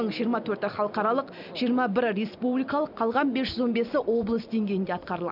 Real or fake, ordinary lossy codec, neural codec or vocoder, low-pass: real; none; none; 5.4 kHz